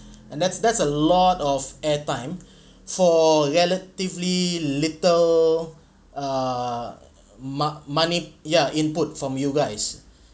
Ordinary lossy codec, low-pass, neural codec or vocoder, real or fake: none; none; none; real